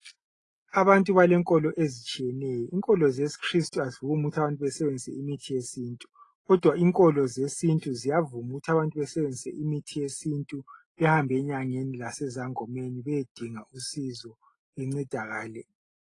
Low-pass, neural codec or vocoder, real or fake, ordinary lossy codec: 10.8 kHz; none; real; AAC, 32 kbps